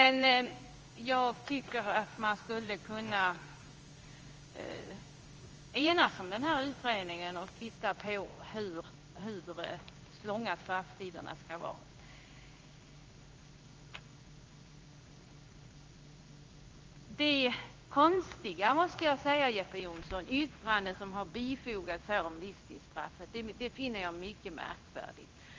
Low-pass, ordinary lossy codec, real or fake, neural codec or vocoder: 7.2 kHz; Opus, 24 kbps; fake; codec, 16 kHz in and 24 kHz out, 1 kbps, XY-Tokenizer